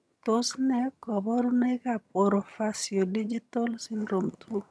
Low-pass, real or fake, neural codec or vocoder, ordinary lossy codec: none; fake; vocoder, 22.05 kHz, 80 mel bands, HiFi-GAN; none